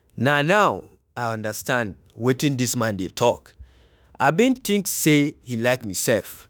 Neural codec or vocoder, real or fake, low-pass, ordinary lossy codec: autoencoder, 48 kHz, 32 numbers a frame, DAC-VAE, trained on Japanese speech; fake; none; none